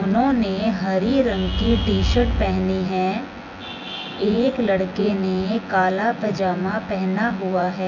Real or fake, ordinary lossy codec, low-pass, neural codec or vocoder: fake; none; 7.2 kHz; vocoder, 24 kHz, 100 mel bands, Vocos